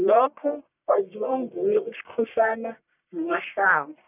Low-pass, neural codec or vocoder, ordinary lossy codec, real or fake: 3.6 kHz; codec, 44.1 kHz, 1.7 kbps, Pupu-Codec; none; fake